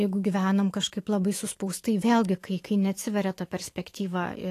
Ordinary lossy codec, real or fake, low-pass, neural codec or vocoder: AAC, 48 kbps; fake; 14.4 kHz; autoencoder, 48 kHz, 128 numbers a frame, DAC-VAE, trained on Japanese speech